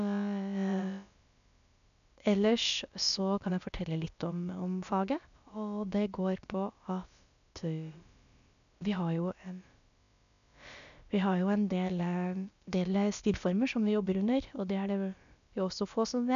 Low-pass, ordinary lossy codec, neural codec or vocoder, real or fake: 7.2 kHz; none; codec, 16 kHz, about 1 kbps, DyCAST, with the encoder's durations; fake